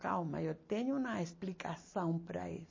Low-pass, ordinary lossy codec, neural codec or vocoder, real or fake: 7.2 kHz; MP3, 32 kbps; none; real